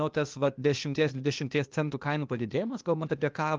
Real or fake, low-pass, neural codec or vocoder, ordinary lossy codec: fake; 7.2 kHz; codec, 16 kHz, 0.8 kbps, ZipCodec; Opus, 24 kbps